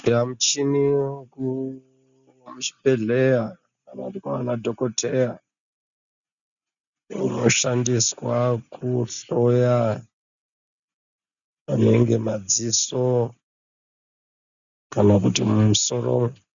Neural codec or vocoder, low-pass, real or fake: none; 7.2 kHz; real